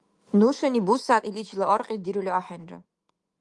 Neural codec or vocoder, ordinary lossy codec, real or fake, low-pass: autoencoder, 48 kHz, 128 numbers a frame, DAC-VAE, trained on Japanese speech; Opus, 24 kbps; fake; 10.8 kHz